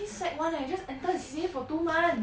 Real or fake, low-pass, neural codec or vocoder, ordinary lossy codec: real; none; none; none